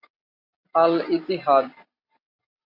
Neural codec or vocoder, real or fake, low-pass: none; real; 5.4 kHz